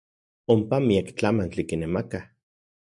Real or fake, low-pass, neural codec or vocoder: real; 10.8 kHz; none